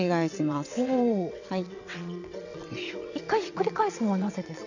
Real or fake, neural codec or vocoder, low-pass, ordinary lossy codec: fake; vocoder, 22.05 kHz, 80 mel bands, WaveNeXt; 7.2 kHz; none